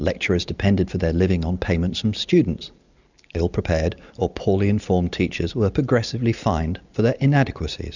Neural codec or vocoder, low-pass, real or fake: none; 7.2 kHz; real